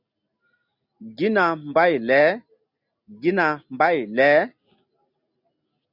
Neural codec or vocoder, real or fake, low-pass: none; real; 5.4 kHz